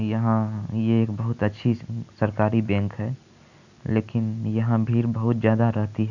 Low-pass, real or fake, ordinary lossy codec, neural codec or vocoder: 7.2 kHz; real; none; none